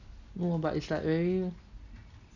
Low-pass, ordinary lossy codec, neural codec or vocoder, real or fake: 7.2 kHz; none; none; real